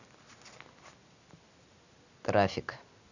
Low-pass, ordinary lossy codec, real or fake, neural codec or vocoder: 7.2 kHz; none; real; none